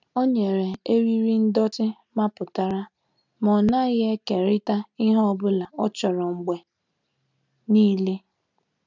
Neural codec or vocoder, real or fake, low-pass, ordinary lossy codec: none; real; 7.2 kHz; none